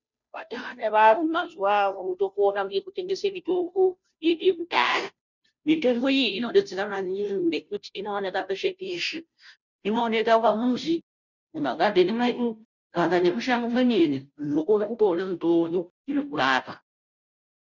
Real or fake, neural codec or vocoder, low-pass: fake; codec, 16 kHz, 0.5 kbps, FunCodec, trained on Chinese and English, 25 frames a second; 7.2 kHz